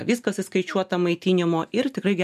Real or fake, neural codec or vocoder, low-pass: real; none; 14.4 kHz